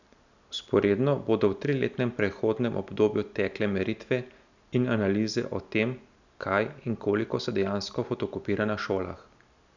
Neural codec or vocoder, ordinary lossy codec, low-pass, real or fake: none; none; 7.2 kHz; real